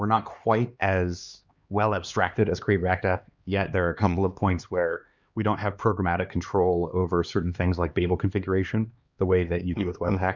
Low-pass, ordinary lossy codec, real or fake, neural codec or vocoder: 7.2 kHz; Opus, 64 kbps; fake; codec, 16 kHz, 2 kbps, X-Codec, HuBERT features, trained on LibriSpeech